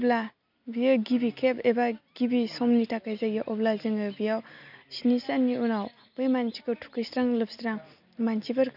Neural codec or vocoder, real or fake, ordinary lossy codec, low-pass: none; real; none; 5.4 kHz